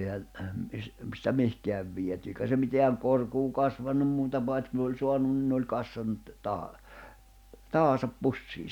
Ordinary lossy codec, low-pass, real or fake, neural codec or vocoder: none; 19.8 kHz; real; none